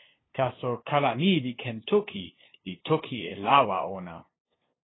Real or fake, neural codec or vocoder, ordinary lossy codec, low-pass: fake; codec, 16 kHz, 2 kbps, FunCodec, trained on LibriTTS, 25 frames a second; AAC, 16 kbps; 7.2 kHz